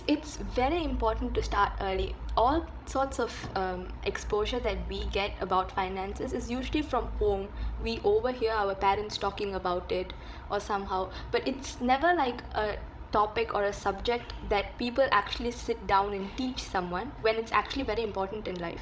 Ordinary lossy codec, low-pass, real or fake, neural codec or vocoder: none; none; fake; codec, 16 kHz, 16 kbps, FreqCodec, larger model